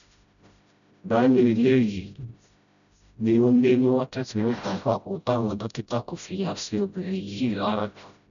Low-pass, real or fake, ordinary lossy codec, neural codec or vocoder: 7.2 kHz; fake; none; codec, 16 kHz, 0.5 kbps, FreqCodec, smaller model